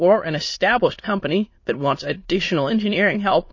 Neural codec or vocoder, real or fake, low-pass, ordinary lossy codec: autoencoder, 22.05 kHz, a latent of 192 numbers a frame, VITS, trained on many speakers; fake; 7.2 kHz; MP3, 32 kbps